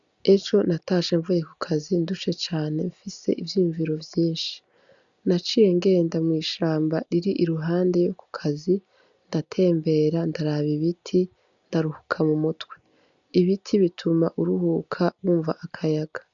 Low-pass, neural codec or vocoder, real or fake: 7.2 kHz; none; real